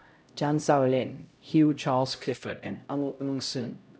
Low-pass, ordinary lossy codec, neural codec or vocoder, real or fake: none; none; codec, 16 kHz, 0.5 kbps, X-Codec, HuBERT features, trained on LibriSpeech; fake